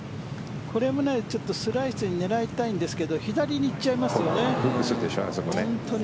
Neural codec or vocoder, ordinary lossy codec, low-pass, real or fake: none; none; none; real